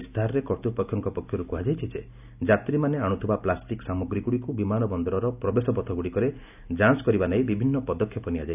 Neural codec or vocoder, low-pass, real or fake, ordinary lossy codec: none; 3.6 kHz; real; none